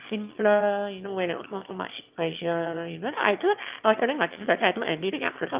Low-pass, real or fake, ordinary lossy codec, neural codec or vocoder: 3.6 kHz; fake; Opus, 24 kbps; autoencoder, 22.05 kHz, a latent of 192 numbers a frame, VITS, trained on one speaker